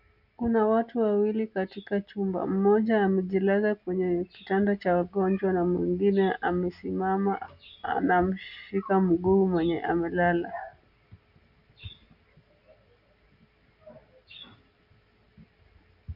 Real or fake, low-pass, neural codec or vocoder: real; 5.4 kHz; none